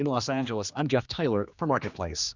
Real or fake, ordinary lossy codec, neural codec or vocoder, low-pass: fake; Opus, 64 kbps; codec, 16 kHz, 2 kbps, X-Codec, HuBERT features, trained on general audio; 7.2 kHz